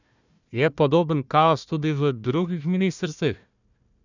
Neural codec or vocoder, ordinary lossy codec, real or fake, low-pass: codec, 16 kHz, 1 kbps, FunCodec, trained on Chinese and English, 50 frames a second; none; fake; 7.2 kHz